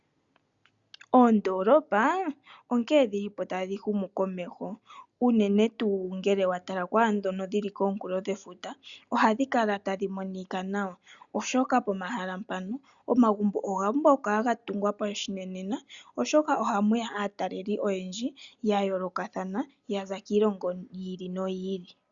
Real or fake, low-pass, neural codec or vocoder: real; 7.2 kHz; none